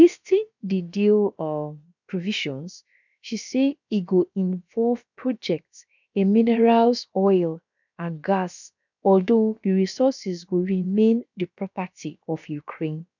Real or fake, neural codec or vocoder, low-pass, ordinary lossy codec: fake; codec, 16 kHz, about 1 kbps, DyCAST, with the encoder's durations; 7.2 kHz; none